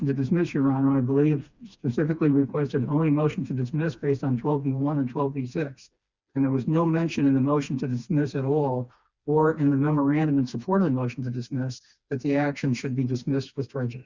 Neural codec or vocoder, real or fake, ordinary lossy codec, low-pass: codec, 16 kHz, 2 kbps, FreqCodec, smaller model; fake; Opus, 64 kbps; 7.2 kHz